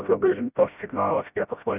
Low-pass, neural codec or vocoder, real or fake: 3.6 kHz; codec, 16 kHz, 0.5 kbps, FreqCodec, smaller model; fake